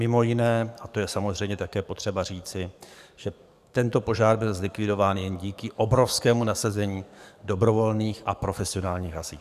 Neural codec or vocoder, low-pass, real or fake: codec, 44.1 kHz, 7.8 kbps, DAC; 14.4 kHz; fake